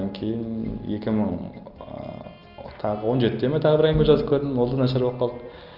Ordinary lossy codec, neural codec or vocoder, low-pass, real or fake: Opus, 32 kbps; none; 5.4 kHz; real